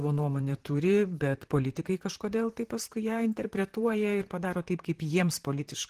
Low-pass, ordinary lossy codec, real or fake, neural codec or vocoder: 14.4 kHz; Opus, 16 kbps; fake; vocoder, 44.1 kHz, 128 mel bands, Pupu-Vocoder